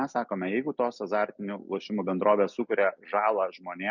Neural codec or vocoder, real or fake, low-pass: none; real; 7.2 kHz